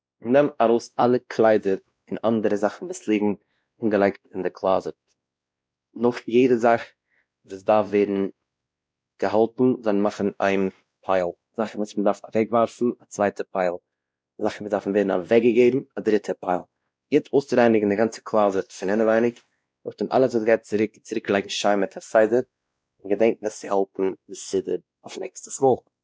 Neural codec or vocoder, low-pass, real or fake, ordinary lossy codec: codec, 16 kHz, 1 kbps, X-Codec, WavLM features, trained on Multilingual LibriSpeech; none; fake; none